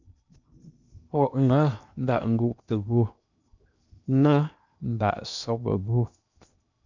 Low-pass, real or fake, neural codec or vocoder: 7.2 kHz; fake; codec, 16 kHz in and 24 kHz out, 0.8 kbps, FocalCodec, streaming, 65536 codes